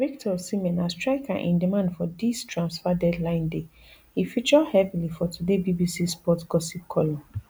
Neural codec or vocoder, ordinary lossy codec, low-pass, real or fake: none; none; none; real